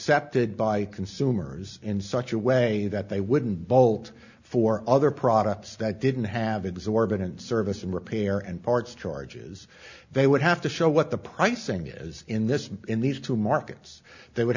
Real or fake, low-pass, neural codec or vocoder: real; 7.2 kHz; none